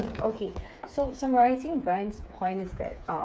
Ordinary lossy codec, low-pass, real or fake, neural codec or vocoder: none; none; fake; codec, 16 kHz, 4 kbps, FreqCodec, smaller model